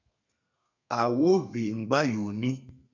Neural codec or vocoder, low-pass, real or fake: codec, 32 kHz, 1.9 kbps, SNAC; 7.2 kHz; fake